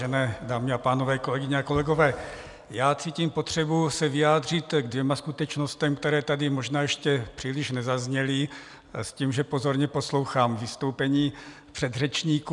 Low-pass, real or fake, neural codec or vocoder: 10.8 kHz; real; none